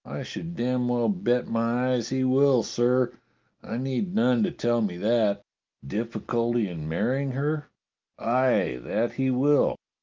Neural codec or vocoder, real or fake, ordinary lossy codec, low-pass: none; real; Opus, 32 kbps; 7.2 kHz